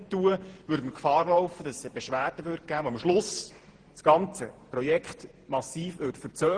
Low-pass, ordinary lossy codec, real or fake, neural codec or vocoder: 9.9 kHz; Opus, 16 kbps; fake; vocoder, 48 kHz, 128 mel bands, Vocos